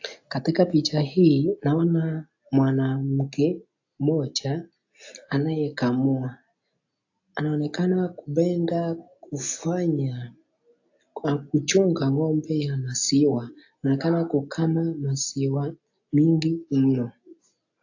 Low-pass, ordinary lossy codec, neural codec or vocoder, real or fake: 7.2 kHz; AAC, 48 kbps; none; real